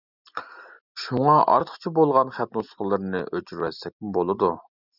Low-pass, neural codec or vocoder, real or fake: 5.4 kHz; none; real